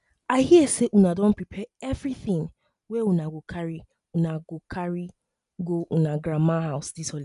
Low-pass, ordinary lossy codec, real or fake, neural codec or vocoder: 10.8 kHz; none; real; none